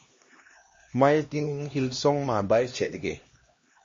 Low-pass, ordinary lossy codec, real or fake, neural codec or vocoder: 7.2 kHz; MP3, 32 kbps; fake; codec, 16 kHz, 1 kbps, X-Codec, HuBERT features, trained on LibriSpeech